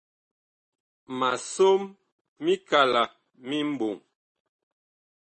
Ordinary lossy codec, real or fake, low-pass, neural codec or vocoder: MP3, 32 kbps; real; 10.8 kHz; none